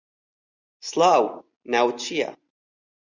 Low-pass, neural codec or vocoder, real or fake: 7.2 kHz; none; real